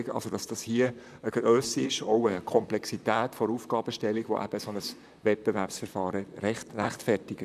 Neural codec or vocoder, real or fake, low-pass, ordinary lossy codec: vocoder, 44.1 kHz, 128 mel bands, Pupu-Vocoder; fake; 14.4 kHz; none